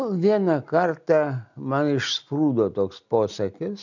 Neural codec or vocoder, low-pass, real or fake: none; 7.2 kHz; real